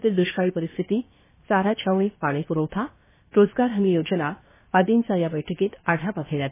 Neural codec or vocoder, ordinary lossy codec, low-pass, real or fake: codec, 16 kHz, 0.7 kbps, FocalCodec; MP3, 16 kbps; 3.6 kHz; fake